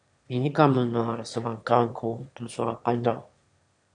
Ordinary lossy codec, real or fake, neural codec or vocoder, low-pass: MP3, 64 kbps; fake; autoencoder, 22.05 kHz, a latent of 192 numbers a frame, VITS, trained on one speaker; 9.9 kHz